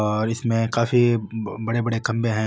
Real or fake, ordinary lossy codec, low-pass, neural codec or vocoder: real; none; none; none